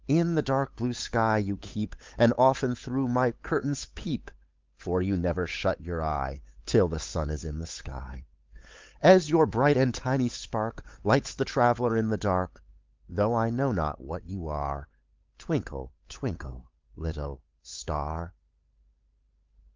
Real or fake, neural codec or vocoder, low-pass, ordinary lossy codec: fake; codec, 16 kHz, 8 kbps, FunCodec, trained on Chinese and English, 25 frames a second; 7.2 kHz; Opus, 24 kbps